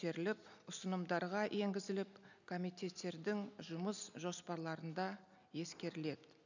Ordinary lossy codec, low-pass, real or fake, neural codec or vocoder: none; 7.2 kHz; real; none